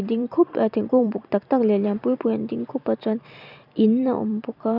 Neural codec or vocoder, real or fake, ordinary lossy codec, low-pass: vocoder, 44.1 kHz, 128 mel bands every 256 samples, BigVGAN v2; fake; none; 5.4 kHz